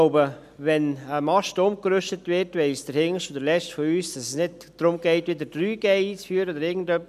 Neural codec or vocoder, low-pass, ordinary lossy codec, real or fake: none; 14.4 kHz; none; real